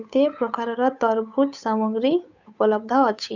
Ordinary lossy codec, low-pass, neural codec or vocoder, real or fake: none; 7.2 kHz; codec, 16 kHz, 8 kbps, FunCodec, trained on Chinese and English, 25 frames a second; fake